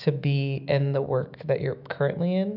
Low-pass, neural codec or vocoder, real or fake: 5.4 kHz; autoencoder, 48 kHz, 128 numbers a frame, DAC-VAE, trained on Japanese speech; fake